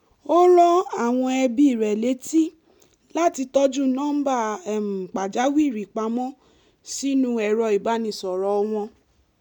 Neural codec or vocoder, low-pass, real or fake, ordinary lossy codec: none; 19.8 kHz; real; none